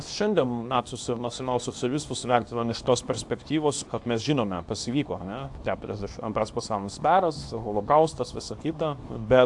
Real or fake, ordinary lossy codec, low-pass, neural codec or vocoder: fake; AAC, 64 kbps; 10.8 kHz; codec, 24 kHz, 0.9 kbps, WavTokenizer, small release